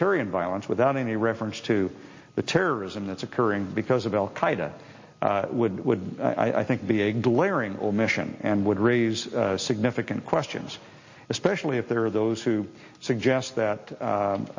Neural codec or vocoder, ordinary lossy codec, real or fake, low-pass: none; MP3, 32 kbps; real; 7.2 kHz